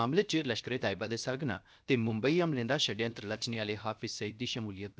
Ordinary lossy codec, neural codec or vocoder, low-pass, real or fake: none; codec, 16 kHz, 0.7 kbps, FocalCodec; none; fake